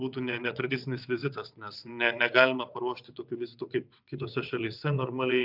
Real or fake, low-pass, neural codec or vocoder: fake; 5.4 kHz; vocoder, 22.05 kHz, 80 mel bands, WaveNeXt